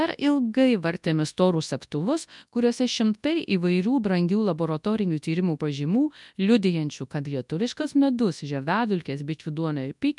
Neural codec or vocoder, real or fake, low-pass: codec, 24 kHz, 0.9 kbps, WavTokenizer, large speech release; fake; 10.8 kHz